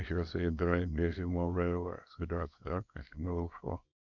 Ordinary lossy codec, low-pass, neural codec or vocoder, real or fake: none; 7.2 kHz; codec, 24 kHz, 0.9 kbps, WavTokenizer, small release; fake